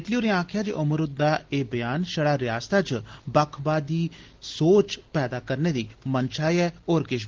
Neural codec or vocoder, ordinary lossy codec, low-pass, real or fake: none; Opus, 16 kbps; 7.2 kHz; real